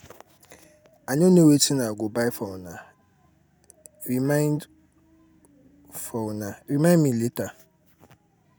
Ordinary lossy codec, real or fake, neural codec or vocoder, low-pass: none; real; none; none